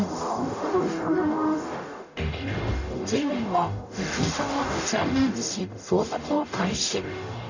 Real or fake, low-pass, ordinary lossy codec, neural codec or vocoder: fake; 7.2 kHz; none; codec, 44.1 kHz, 0.9 kbps, DAC